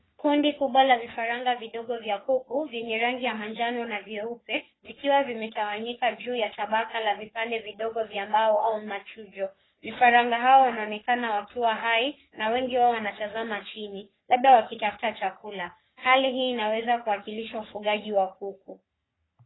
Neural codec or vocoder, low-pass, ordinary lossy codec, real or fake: codec, 44.1 kHz, 3.4 kbps, Pupu-Codec; 7.2 kHz; AAC, 16 kbps; fake